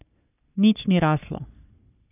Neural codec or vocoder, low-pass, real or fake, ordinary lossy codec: codec, 44.1 kHz, 3.4 kbps, Pupu-Codec; 3.6 kHz; fake; none